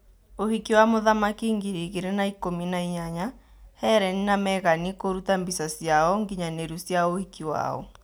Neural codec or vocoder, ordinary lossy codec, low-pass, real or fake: none; none; none; real